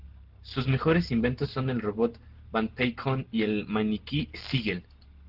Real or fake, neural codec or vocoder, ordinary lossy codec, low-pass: real; none; Opus, 16 kbps; 5.4 kHz